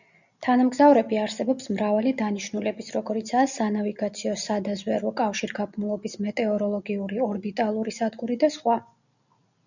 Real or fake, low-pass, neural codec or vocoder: real; 7.2 kHz; none